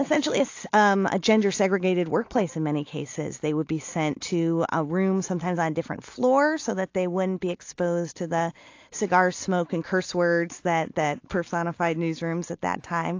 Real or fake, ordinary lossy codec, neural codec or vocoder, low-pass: real; AAC, 48 kbps; none; 7.2 kHz